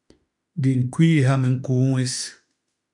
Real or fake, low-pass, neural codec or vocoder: fake; 10.8 kHz; autoencoder, 48 kHz, 32 numbers a frame, DAC-VAE, trained on Japanese speech